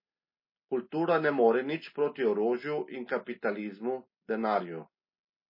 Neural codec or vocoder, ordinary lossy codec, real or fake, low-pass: none; MP3, 24 kbps; real; 5.4 kHz